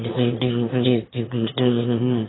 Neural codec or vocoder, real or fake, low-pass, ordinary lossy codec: autoencoder, 22.05 kHz, a latent of 192 numbers a frame, VITS, trained on one speaker; fake; 7.2 kHz; AAC, 16 kbps